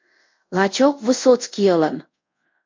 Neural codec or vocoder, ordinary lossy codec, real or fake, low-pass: codec, 24 kHz, 0.5 kbps, DualCodec; MP3, 48 kbps; fake; 7.2 kHz